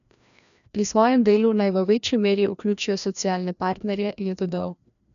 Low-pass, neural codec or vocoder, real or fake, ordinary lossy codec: 7.2 kHz; codec, 16 kHz, 1 kbps, FreqCodec, larger model; fake; none